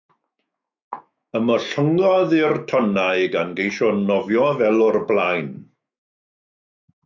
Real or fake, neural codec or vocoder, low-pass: fake; autoencoder, 48 kHz, 128 numbers a frame, DAC-VAE, trained on Japanese speech; 7.2 kHz